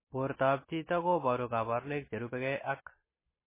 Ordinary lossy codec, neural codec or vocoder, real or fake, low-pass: MP3, 16 kbps; none; real; 3.6 kHz